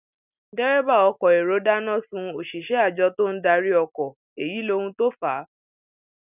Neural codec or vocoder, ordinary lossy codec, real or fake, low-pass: none; none; real; 3.6 kHz